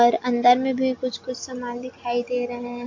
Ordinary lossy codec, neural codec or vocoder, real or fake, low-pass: AAC, 48 kbps; none; real; 7.2 kHz